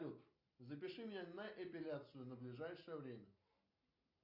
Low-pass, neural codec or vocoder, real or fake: 5.4 kHz; none; real